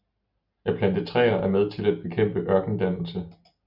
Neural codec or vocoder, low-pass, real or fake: none; 5.4 kHz; real